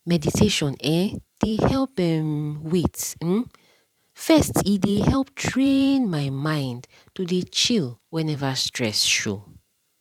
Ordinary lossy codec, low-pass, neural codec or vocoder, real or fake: none; 19.8 kHz; vocoder, 44.1 kHz, 128 mel bands every 512 samples, BigVGAN v2; fake